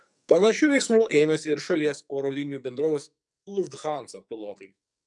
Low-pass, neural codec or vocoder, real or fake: 10.8 kHz; codec, 44.1 kHz, 2.6 kbps, SNAC; fake